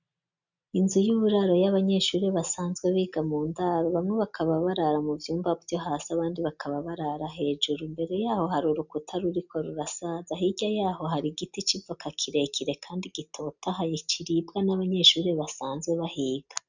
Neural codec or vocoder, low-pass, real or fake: none; 7.2 kHz; real